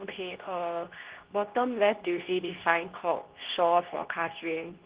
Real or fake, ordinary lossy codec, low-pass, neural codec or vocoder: fake; Opus, 16 kbps; 3.6 kHz; codec, 24 kHz, 0.9 kbps, WavTokenizer, medium speech release version 2